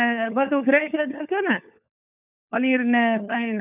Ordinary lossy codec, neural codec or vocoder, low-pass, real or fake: none; codec, 16 kHz, 4 kbps, FunCodec, trained on LibriTTS, 50 frames a second; 3.6 kHz; fake